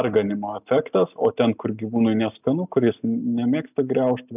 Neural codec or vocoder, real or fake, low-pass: none; real; 3.6 kHz